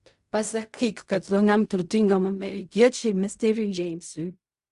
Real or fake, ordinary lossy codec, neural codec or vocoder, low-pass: fake; Opus, 64 kbps; codec, 16 kHz in and 24 kHz out, 0.4 kbps, LongCat-Audio-Codec, fine tuned four codebook decoder; 10.8 kHz